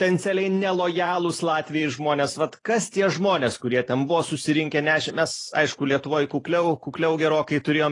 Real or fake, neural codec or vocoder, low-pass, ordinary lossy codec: fake; vocoder, 44.1 kHz, 128 mel bands every 512 samples, BigVGAN v2; 10.8 kHz; AAC, 32 kbps